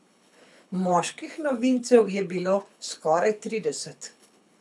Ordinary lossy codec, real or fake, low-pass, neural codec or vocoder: none; fake; none; codec, 24 kHz, 6 kbps, HILCodec